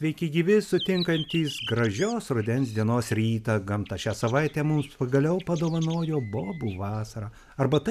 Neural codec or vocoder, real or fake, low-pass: none; real; 14.4 kHz